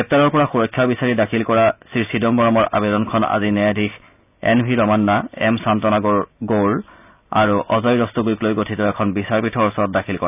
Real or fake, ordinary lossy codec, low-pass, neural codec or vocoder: real; none; 3.6 kHz; none